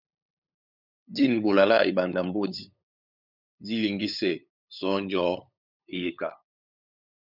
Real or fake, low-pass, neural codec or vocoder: fake; 5.4 kHz; codec, 16 kHz, 8 kbps, FunCodec, trained on LibriTTS, 25 frames a second